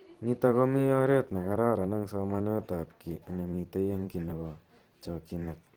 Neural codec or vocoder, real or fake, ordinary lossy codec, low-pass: vocoder, 44.1 kHz, 128 mel bands every 512 samples, BigVGAN v2; fake; Opus, 16 kbps; 19.8 kHz